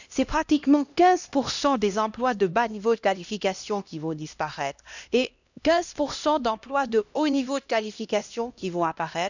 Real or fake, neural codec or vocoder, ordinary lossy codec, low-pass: fake; codec, 16 kHz, 1 kbps, X-Codec, HuBERT features, trained on LibriSpeech; none; 7.2 kHz